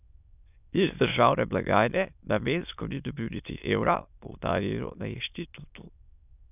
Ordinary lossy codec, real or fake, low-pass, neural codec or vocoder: none; fake; 3.6 kHz; autoencoder, 22.05 kHz, a latent of 192 numbers a frame, VITS, trained on many speakers